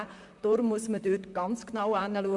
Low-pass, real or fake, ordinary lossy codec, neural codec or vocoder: 10.8 kHz; fake; MP3, 64 kbps; vocoder, 44.1 kHz, 128 mel bands, Pupu-Vocoder